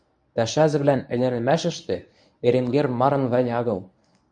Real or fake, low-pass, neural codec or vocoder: fake; 9.9 kHz; codec, 24 kHz, 0.9 kbps, WavTokenizer, medium speech release version 1